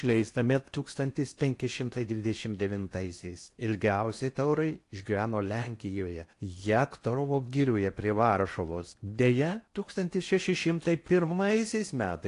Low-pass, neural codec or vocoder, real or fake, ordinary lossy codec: 10.8 kHz; codec, 16 kHz in and 24 kHz out, 0.8 kbps, FocalCodec, streaming, 65536 codes; fake; AAC, 48 kbps